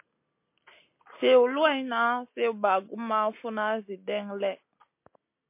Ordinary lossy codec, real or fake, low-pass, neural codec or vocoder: MP3, 24 kbps; real; 3.6 kHz; none